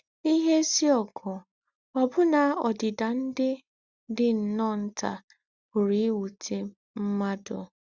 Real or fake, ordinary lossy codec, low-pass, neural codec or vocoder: real; Opus, 64 kbps; 7.2 kHz; none